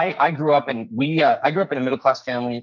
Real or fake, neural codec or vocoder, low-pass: fake; codec, 44.1 kHz, 2.6 kbps, SNAC; 7.2 kHz